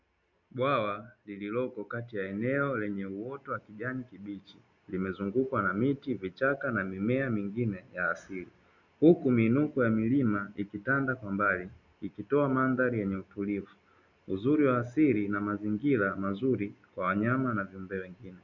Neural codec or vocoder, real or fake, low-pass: none; real; 7.2 kHz